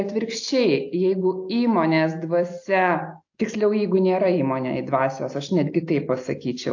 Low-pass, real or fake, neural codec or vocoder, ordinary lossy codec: 7.2 kHz; real; none; AAC, 48 kbps